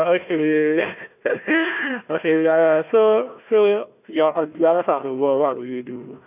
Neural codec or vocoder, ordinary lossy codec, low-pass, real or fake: codec, 16 kHz, 1 kbps, FunCodec, trained on Chinese and English, 50 frames a second; none; 3.6 kHz; fake